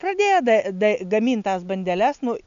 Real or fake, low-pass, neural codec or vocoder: real; 7.2 kHz; none